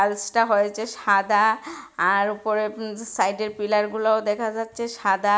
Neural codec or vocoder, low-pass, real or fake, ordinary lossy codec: none; none; real; none